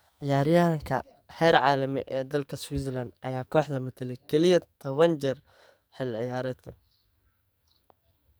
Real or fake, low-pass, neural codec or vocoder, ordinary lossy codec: fake; none; codec, 44.1 kHz, 2.6 kbps, SNAC; none